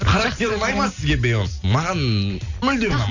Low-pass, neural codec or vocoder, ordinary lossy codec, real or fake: 7.2 kHz; none; none; real